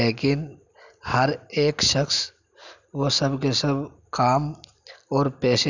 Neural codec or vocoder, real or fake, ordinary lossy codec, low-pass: none; real; none; 7.2 kHz